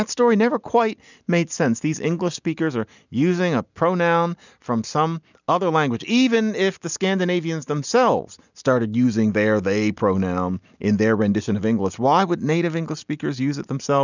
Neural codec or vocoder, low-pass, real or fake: none; 7.2 kHz; real